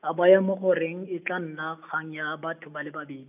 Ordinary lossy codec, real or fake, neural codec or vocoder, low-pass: none; real; none; 3.6 kHz